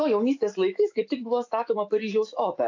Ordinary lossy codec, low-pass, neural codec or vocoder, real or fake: AAC, 48 kbps; 7.2 kHz; autoencoder, 48 kHz, 128 numbers a frame, DAC-VAE, trained on Japanese speech; fake